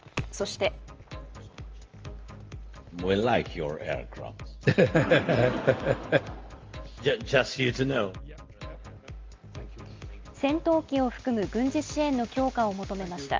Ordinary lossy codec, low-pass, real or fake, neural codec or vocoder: Opus, 24 kbps; 7.2 kHz; real; none